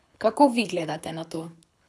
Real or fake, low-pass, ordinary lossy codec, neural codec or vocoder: fake; none; none; codec, 24 kHz, 6 kbps, HILCodec